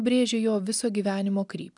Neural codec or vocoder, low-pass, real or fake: none; 10.8 kHz; real